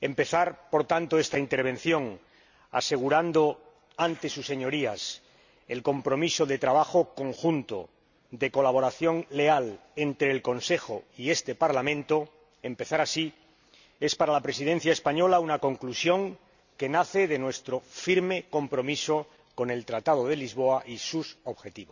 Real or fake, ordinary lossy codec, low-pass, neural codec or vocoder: real; none; 7.2 kHz; none